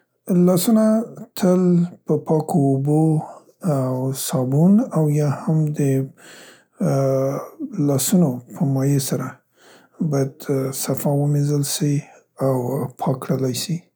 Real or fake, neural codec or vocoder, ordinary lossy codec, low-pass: real; none; none; none